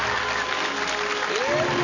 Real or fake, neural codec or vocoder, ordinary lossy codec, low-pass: real; none; none; 7.2 kHz